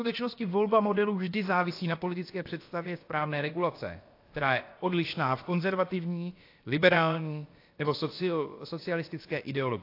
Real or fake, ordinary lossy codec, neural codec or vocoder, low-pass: fake; AAC, 32 kbps; codec, 16 kHz, about 1 kbps, DyCAST, with the encoder's durations; 5.4 kHz